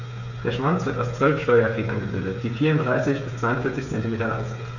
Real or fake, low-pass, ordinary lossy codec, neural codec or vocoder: fake; 7.2 kHz; none; codec, 16 kHz, 8 kbps, FreqCodec, smaller model